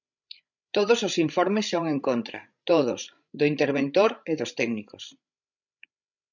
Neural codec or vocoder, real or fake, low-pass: codec, 16 kHz, 16 kbps, FreqCodec, larger model; fake; 7.2 kHz